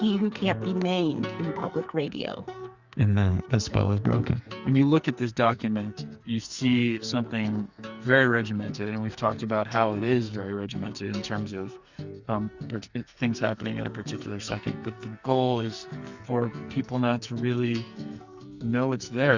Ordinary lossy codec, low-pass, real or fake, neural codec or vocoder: Opus, 64 kbps; 7.2 kHz; fake; codec, 44.1 kHz, 2.6 kbps, SNAC